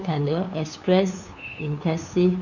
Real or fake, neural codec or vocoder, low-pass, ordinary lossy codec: fake; codec, 16 kHz, 2 kbps, FunCodec, trained on LibriTTS, 25 frames a second; 7.2 kHz; none